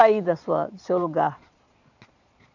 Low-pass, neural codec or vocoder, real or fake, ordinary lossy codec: 7.2 kHz; vocoder, 22.05 kHz, 80 mel bands, Vocos; fake; none